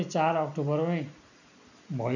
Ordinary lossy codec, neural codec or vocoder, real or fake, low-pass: none; none; real; 7.2 kHz